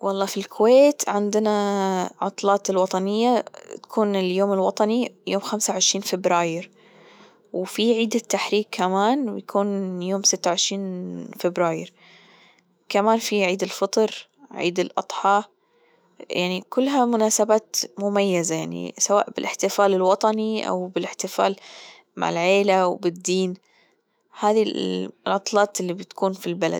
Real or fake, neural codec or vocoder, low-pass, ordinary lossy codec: fake; autoencoder, 48 kHz, 128 numbers a frame, DAC-VAE, trained on Japanese speech; none; none